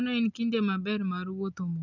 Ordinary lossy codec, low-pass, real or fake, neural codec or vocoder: none; 7.2 kHz; real; none